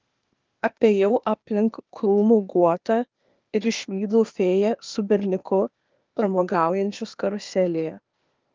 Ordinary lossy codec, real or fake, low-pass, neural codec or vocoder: Opus, 32 kbps; fake; 7.2 kHz; codec, 16 kHz, 0.8 kbps, ZipCodec